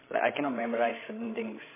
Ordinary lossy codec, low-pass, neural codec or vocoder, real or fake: MP3, 16 kbps; 3.6 kHz; codec, 16 kHz, 16 kbps, FreqCodec, larger model; fake